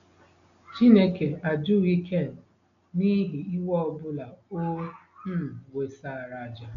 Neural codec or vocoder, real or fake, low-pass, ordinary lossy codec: none; real; 7.2 kHz; none